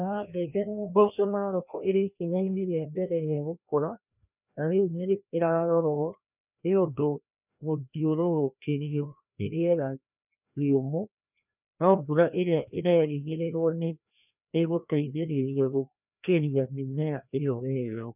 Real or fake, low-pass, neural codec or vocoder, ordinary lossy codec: fake; 3.6 kHz; codec, 16 kHz, 1 kbps, FreqCodec, larger model; none